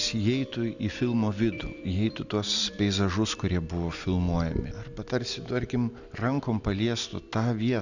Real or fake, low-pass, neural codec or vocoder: real; 7.2 kHz; none